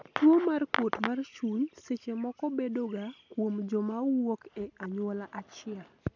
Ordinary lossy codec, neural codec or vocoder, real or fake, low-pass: none; none; real; 7.2 kHz